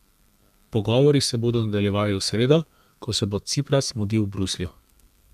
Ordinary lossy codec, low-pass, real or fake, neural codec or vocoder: none; 14.4 kHz; fake; codec, 32 kHz, 1.9 kbps, SNAC